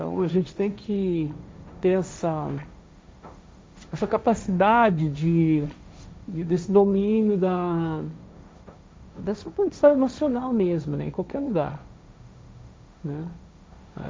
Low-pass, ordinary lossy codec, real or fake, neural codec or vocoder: none; none; fake; codec, 16 kHz, 1.1 kbps, Voila-Tokenizer